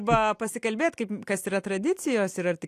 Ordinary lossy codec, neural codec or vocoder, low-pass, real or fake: AAC, 64 kbps; none; 14.4 kHz; real